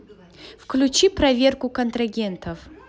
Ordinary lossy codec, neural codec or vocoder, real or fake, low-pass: none; none; real; none